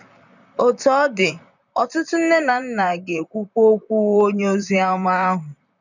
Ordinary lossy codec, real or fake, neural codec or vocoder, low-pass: none; fake; vocoder, 44.1 kHz, 128 mel bands, Pupu-Vocoder; 7.2 kHz